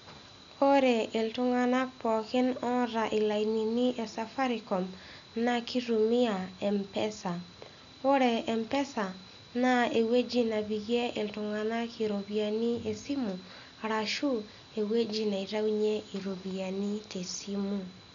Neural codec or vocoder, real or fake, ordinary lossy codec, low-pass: none; real; none; 7.2 kHz